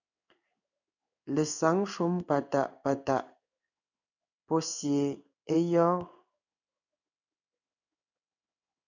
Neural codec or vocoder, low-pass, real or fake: codec, 16 kHz in and 24 kHz out, 1 kbps, XY-Tokenizer; 7.2 kHz; fake